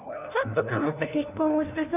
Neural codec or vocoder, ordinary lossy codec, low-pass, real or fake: codec, 16 kHz, 1 kbps, FunCodec, trained on LibriTTS, 50 frames a second; none; 3.6 kHz; fake